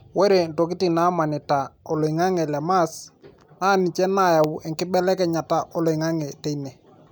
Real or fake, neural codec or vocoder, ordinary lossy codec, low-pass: real; none; none; none